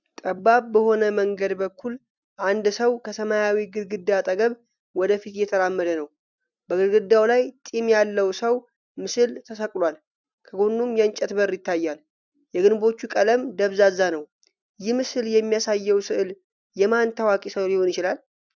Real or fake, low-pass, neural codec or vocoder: real; 7.2 kHz; none